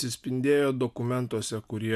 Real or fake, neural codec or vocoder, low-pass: real; none; 14.4 kHz